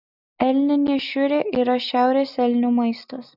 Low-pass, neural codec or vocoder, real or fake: 5.4 kHz; none; real